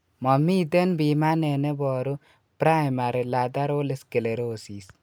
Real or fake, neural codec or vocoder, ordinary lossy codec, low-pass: real; none; none; none